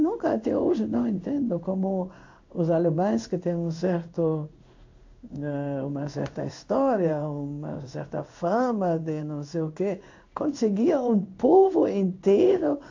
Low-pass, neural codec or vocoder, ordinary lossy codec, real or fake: 7.2 kHz; codec, 16 kHz in and 24 kHz out, 1 kbps, XY-Tokenizer; AAC, 48 kbps; fake